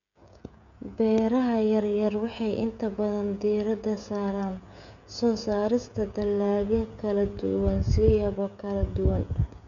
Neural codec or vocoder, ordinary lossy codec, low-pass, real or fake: codec, 16 kHz, 16 kbps, FreqCodec, smaller model; none; 7.2 kHz; fake